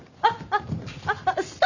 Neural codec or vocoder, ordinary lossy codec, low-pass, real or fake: none; none; 7.2 kHz; real